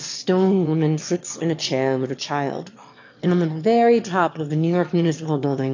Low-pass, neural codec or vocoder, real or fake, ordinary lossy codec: 7.2 kHz; autoencoder, 22.05 kHz, a latent of 192 numbers a frame, VITS, trained on one speaker; fake; AAC, 48 kbps